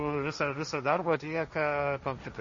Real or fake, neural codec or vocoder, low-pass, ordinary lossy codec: fake; codec, 16 kHz, 1.1 kbps, Voila-Tokenizer; 7.2 kHz; MP3, 32 kbps